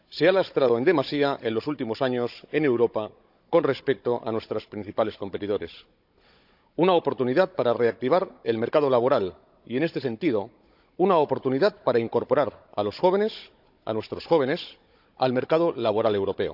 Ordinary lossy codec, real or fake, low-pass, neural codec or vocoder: none; fake; 5.4 kHz; codec, 16 kHz, 16 kbps, FunCodec, trained on Chinese and English, 50 frames a second